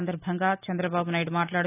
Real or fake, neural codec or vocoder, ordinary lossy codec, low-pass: real; none; none; 3.6 kHz